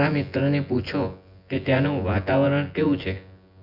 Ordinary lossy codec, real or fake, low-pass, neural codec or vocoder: none; fake; 5.4 kHz; vocoder, 24 kHz, 100 mel bands, Vocos